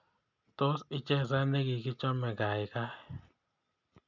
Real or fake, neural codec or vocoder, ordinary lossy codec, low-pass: real; none; none; 7.2 kHz